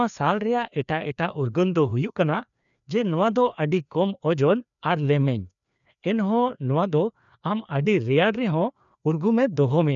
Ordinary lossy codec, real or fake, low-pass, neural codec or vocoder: none; fake; 7.2 kHz; codec, 16 kHz, 2 kbps, FreqCodec, larger model